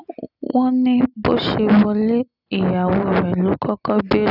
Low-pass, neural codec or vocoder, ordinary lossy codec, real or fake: 5.4 kHz; none; none; real